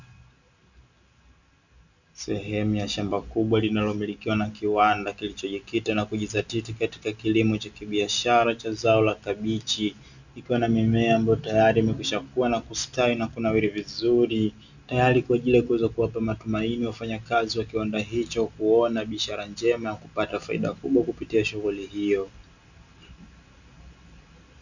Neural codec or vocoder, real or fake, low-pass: none; real; 7.2 kHz